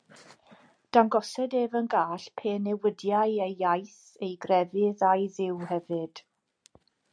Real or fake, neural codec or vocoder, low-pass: real; none; 9.9 kHz